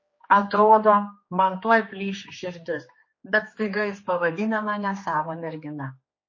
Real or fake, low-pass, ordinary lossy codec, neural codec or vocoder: fake; 7.2 kHz; MP3, 32 kbps; codec, 16 kHz, 2 kbps, X-Codec, HuBERT features, trained on general audio